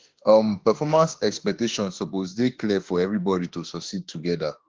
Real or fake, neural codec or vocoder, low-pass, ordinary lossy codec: fake; autoencoder, 48 kHz, 32 numbers a frame, DAC-VAE, trained on Japanese speech; 7.2 kHz; Opus, 16 kbps